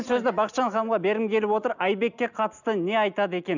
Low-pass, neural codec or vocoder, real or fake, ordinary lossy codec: 7.2 kHz; none; real; none